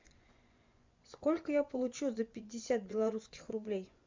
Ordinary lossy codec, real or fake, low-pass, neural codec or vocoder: MP3, 64 kbps; fake; 7.2 kHz; vocoder, 24 kHz, 100 mel bands, Vocos